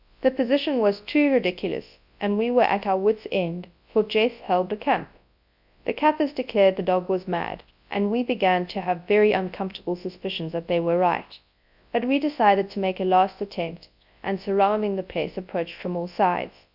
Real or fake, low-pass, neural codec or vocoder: fake; 5.4 kHz; codec, 24 kHz, 0.9 kbps, WavTokenizer, large speech release